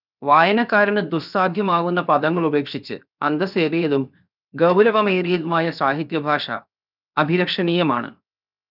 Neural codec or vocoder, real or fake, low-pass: codec, 16 kHz, 0.7 kbps, FocalCodec; fake; 5.4 kHz